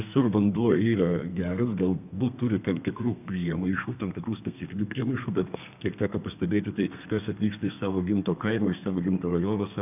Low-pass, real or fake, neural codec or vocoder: 3.6 kHz; fake; codec, 32 kHz, 1.9 kbps, SNAC